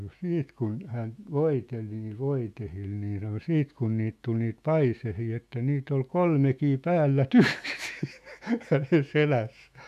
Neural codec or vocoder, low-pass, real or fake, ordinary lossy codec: autoencoder, 48 kHz, 128 numbers a frame, DAC-VAE, trained on Japanese speech; 14.4 kHz; fake; MP3, 96 kbps